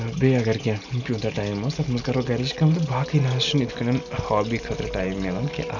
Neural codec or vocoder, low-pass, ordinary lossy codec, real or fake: none; 7.2 kHz; none; real